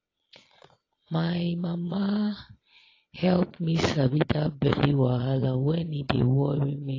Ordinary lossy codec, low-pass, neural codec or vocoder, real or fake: AAC, 32 kbps; 7.2 kHz; vocoder, 22.05 kHz, 80 mel bands, Vocos; fake